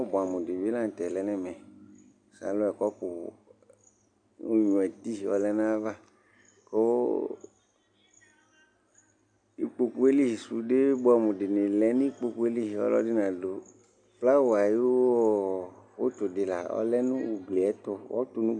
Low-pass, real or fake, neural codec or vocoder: 9.9 kHz; real; none